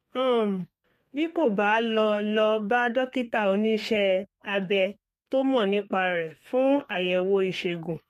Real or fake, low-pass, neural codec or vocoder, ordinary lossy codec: fake; 14.4 kHz; codec, 32 kHz, 1.9 kbps, SNAC; MP3, 64 kbps